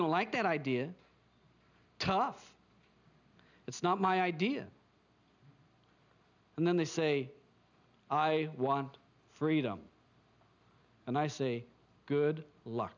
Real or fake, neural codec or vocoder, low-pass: real; none; 7.2 kHz